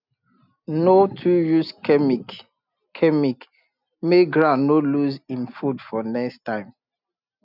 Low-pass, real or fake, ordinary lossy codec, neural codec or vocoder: 5.4 kHz; real; none; none